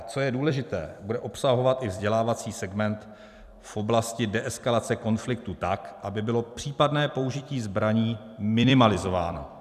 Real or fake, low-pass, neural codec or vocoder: fake; 14.4 kHz; vocoder, 44.1 kHz, 128 mel bands every 256 samples, BigVGAN v2